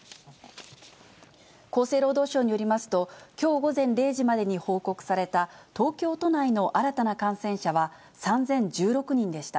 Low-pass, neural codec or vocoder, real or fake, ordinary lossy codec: none; none; real; none